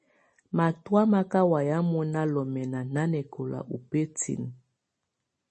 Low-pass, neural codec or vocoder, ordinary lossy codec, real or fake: 10.8 kHz; none; MP3, 32 kbps; real